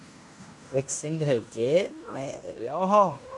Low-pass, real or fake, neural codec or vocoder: 10.8 kHz; fake; codec, 16 kHz in and 24 kHz out, 0.9 kbps, LongCat-Audio-Codec, fine tuned four codebook decoder